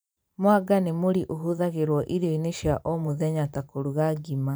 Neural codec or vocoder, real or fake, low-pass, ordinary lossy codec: vocoder, 44.1 kHz, 128 mel bands every 512 samples, BigVGAN v2; fake; none; none